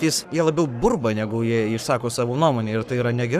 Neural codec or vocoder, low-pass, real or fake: codec, 44.1 kHz, 7.8 kbps, Pupu-Codec; 14.4 kHz; fake